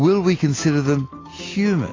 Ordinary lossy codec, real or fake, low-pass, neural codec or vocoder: AAC, 32 kbps; real; 7.2 kHz; none